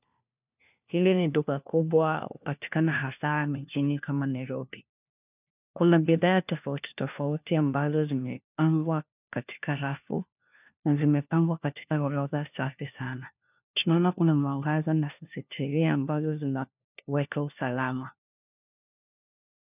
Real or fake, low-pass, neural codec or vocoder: fake; 3.6 kHz; codec, 16 kHz, 1 kbps, FunCodec, trained on LibriTTS, 50 frames a second